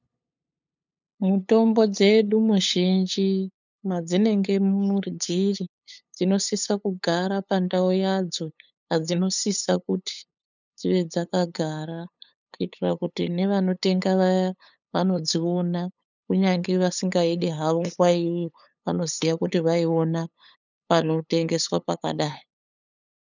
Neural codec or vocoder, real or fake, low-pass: codec, 16 kHz, 8 kbps, FunCodec, trained on LibriTTS, 25 frames a second; fake; 7.2 kHz